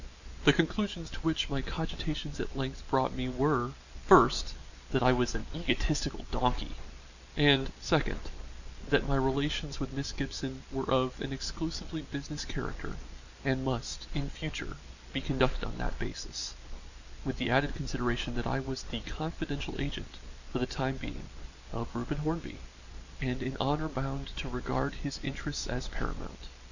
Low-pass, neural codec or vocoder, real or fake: 7.2 kHz; none; real